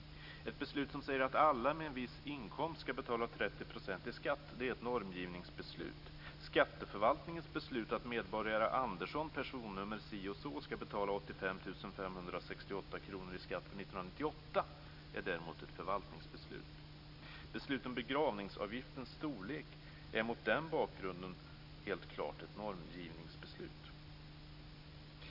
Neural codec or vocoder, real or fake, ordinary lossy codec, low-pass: none; real; none; 5.4 kHz